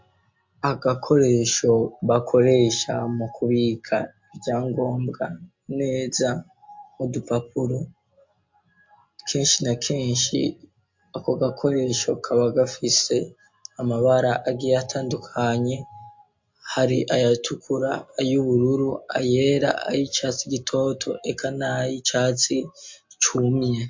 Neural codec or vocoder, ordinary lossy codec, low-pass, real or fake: none; MP3, 48 kbps; 7.2 kHz; real